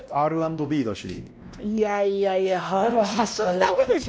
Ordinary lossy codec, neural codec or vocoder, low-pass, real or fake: none; codec, 16 kHz, 1 kbps, X-Codec, WavLM features, trained on Multilingual LibriSpeech; none; fake